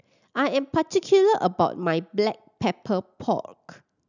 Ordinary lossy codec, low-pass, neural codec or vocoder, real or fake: none; 7.2 kHz; none; real